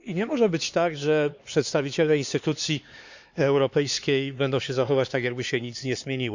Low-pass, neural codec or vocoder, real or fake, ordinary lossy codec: 7.2 kHz; codec, 16 kHz, 4 kbps, X-Codec, HuBERT features, trained on LibriSpeech; fake; Opus, 64 kbps